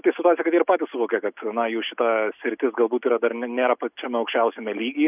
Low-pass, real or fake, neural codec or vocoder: 3.6 kHz; real; none